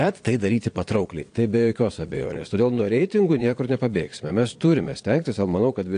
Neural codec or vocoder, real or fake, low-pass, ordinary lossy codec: vocoder, 22.05 kHz, 80 mel bands, Vocos; fake; 9.9 kHz; AAC, 48 kbps